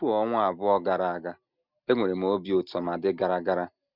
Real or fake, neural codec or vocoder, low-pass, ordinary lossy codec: real; none; 5.4 kHz; none